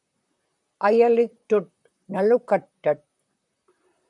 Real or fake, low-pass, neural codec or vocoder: fake; 10.8 kHz; vocoder, 44.1 kHz, 128 mel bands, Pupu-Vocoder